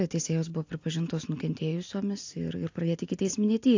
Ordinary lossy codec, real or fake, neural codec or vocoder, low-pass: AAC, 48 kbps; real; none; 7.2 kHz